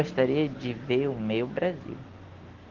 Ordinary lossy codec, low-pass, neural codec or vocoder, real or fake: Opus, 16 kbps; 7.2 kHz; none; real